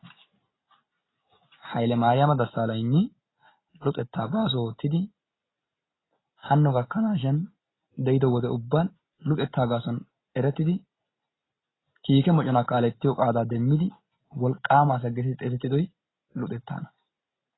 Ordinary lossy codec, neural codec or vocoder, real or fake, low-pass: AAC, 16 kbps; none; real; 7.2 kHz